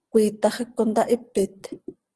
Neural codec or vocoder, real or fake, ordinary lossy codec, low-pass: none; real; Opus, 16 kbps; 10.8 kHz